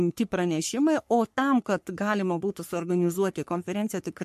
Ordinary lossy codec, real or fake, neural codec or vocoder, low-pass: MP3, 64 kbps; fake; codec, 44.1 kHz, 3.4 kbps, Pupu-Codec; 14.4 kHz